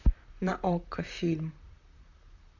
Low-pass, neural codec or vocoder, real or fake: 7.2 kHz; vocoder, 44.1 kHz, 128 mel bands, Pupu-Vocoder; fake